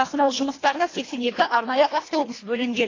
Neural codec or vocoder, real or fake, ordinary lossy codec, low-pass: codec, 24 kHz, 1.5 kbps, HILCodec; fake; AAC, 32 kbps; 7.2 kHz